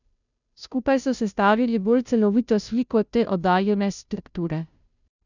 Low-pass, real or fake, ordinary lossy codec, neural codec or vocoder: 7.2 kHz; fake; none; codec, 16 kHz, 0.5 kbps, FunCodec, trained on Chinese and English, 25 frames a second